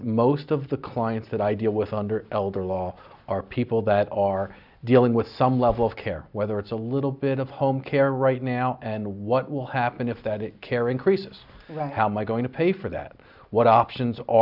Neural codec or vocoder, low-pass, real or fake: none; 5.4 kHz; real